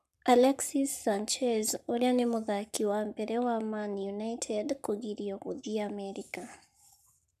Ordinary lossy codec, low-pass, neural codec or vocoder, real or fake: none; 14.4 kHz; codec, 44.1 kHz, 7.8 kbps, Pupu-Codec; fake